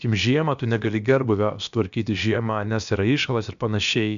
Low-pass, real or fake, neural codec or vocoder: 7.2 kHz; fake; codec, 16 kHz, about 1 kbps, DyCAST, with the encoder's durations